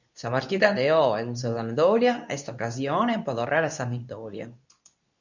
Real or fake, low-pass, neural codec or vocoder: fake; 7.2 kHz; codec, 24 kHz, 0.9 kbps, WavTokenizer, medium speech release version 2